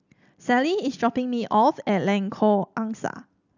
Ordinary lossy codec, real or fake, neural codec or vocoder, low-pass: none; real; none; 7.2 kHz